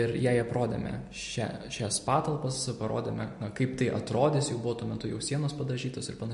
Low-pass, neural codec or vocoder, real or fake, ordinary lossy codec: 10.8 kHz; none; real; MP3, 48 kbps